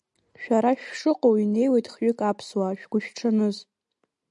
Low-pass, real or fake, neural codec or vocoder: 10.8 kHz; real; none